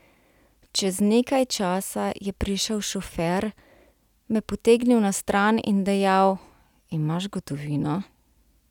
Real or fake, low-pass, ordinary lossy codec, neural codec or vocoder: real; 19.8 kHz; none; none